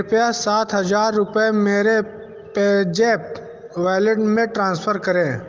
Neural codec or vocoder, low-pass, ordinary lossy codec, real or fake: none; 7.2 kHz; Opus, 24 kbps; real